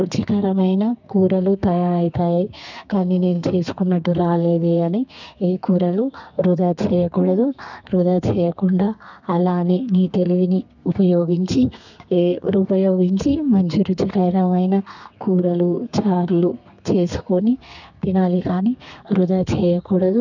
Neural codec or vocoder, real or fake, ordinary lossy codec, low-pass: codec, 32 kHz, 1.9 kbps, SNAC; fake; none; 7.2 kHz